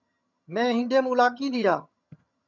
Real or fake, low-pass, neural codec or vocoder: fake; 7.2 kHz; vocoder, 22.05 kHz, 80 mel bands, HiFi-GAN